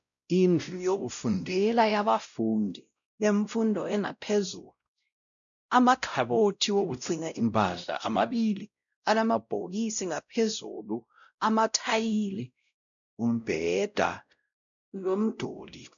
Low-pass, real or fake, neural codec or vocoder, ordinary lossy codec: 7.2 kHz; fake; codec, 16 kHz, 0.5 kbps, X-Codec, WavLM features, trained on Multilingual LibriSpeech; MP3, 96 kbps